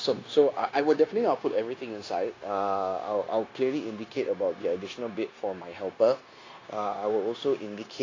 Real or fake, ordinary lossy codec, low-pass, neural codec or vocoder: fake; AAC, 32 kbps; 7.2 kHz; codec, 16 kHz, 0.9 kbps, LongCat-Audio-Codec